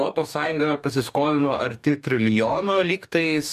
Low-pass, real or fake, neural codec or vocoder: 14.4 kHz; fake; codec, 44.1 kHz, 2.6 kbps, DAC